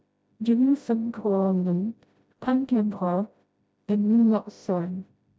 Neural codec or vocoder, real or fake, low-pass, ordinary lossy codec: codec, 16 kHz, 0.5 kbps, FreqCodec, smaller model; fake; none; none